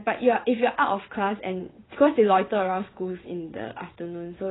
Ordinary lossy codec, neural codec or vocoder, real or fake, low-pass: AAC, 16 kbps; vocoder, 22.05 kHz, 80 mel bands, WaveNeXt; fake; 7.2 kHz